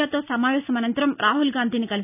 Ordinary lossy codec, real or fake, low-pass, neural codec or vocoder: none; real; 3.6 kHz; none